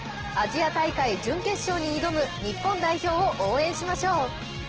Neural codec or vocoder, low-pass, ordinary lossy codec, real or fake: none; 7.2 kHz; Opus, 16 kbps; real